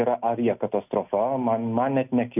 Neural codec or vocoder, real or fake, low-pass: none; real; 3.6 kHz